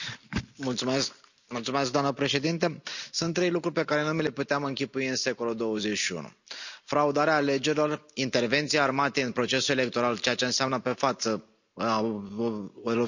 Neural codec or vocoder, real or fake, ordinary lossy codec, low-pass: none; real; none; 7.2 kHz